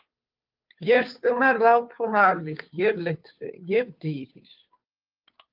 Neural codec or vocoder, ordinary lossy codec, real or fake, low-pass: codec, 16 kHz, 2 kbps, FunCodec, trained on Chinese and English, 25 frames a second; Opus, 24 kbps; fake; 5.4 kHz